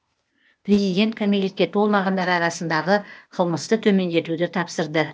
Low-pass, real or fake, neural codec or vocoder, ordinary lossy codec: none; fake; codec, 16 kHz, 0.8 kbps, ZipCodec; none